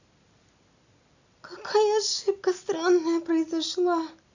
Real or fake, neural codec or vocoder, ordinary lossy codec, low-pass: real; none; none; 7.2 kHz